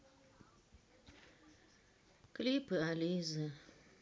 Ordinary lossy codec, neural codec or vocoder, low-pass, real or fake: none; none; none; real